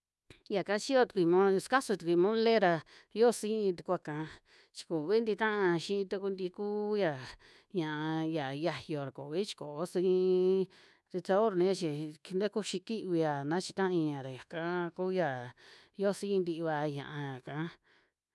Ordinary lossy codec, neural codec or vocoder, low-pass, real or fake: none; codec, 24 kHz, 1.2 kbps, DualCodec; none; fake